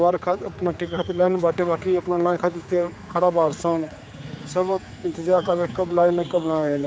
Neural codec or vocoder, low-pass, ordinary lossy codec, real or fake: codec, 16 kHz, 4 kbps, X-Codec, HuBERT features, trained on general audio; none; none; fake